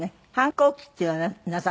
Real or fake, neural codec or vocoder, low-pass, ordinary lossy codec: real; none; none; none